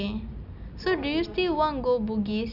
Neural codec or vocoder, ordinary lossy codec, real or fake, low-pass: none; none; real; 5.4 kHz